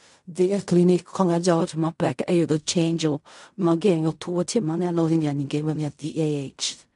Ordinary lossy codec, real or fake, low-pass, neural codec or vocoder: MP3, 64 kbps; fake; 10.8 kHz; codec, 16 kHz in and 24 kHz out, 0.4 kbps, LongCat-Audio-Codec, fine tuned four codebook decoder